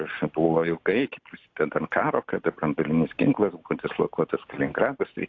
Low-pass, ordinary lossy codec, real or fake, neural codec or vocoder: 7.2 kHz; AAC, 48 kbps; real; none